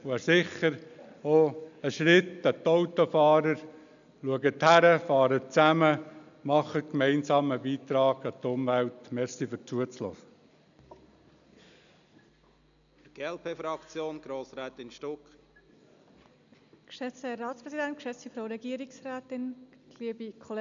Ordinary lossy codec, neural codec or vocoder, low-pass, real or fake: none; none; 7.2 kHz; real